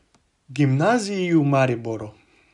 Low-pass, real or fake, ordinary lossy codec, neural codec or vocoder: 10.8 kHz; real; none; none